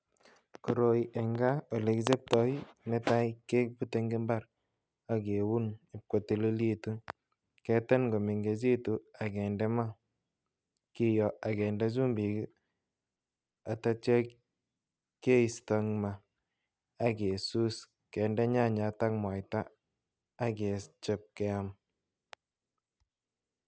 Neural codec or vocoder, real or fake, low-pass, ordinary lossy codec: none; real; none; none